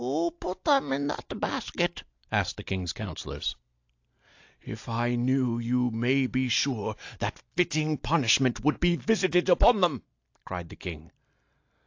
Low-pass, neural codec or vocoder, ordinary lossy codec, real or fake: 7.2 kHz; none; AAC, 48 kbps; real